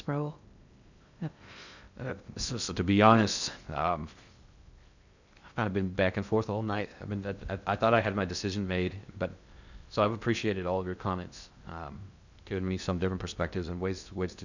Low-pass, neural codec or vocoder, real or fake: 7.2 kHz; codec, 16 kHz in and 24 kHz out, 0.6 kbps, FocalCodec, streaming, 2048 codes; fake